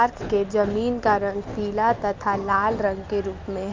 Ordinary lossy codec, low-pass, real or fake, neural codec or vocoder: Opus, 24 kbps; 7.2 kHz; real; none